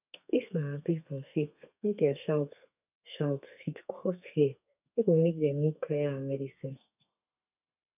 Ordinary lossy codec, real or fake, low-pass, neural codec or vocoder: none; fake; 3.6 kHz; codec, 32 kHz, 1.9 kbps, SNAC